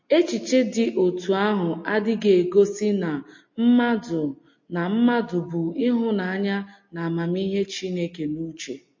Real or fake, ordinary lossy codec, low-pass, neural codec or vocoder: real; MP3, 32 kbps; 7.2 kHz; none